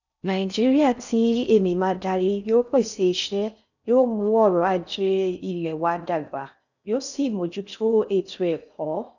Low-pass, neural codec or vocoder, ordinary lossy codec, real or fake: 7.2 kHz; codec, 16 kHz in and 24 kHz out, 0.6 kbps, FocalCodec, streaming, 4096 codes; none; fake